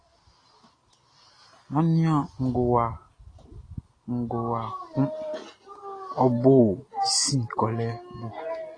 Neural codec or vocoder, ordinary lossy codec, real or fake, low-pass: none; AAC, 32 kbps; real; 9.9 kHz